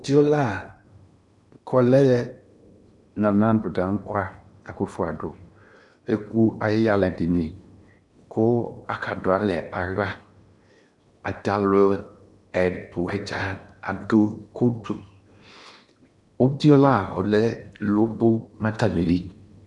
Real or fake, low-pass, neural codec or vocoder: fake; 10.8 kHz; codec, 16 kHz in and 24 kHz out, 0.8 kbps, FocalCodec, streaming, 65536 codes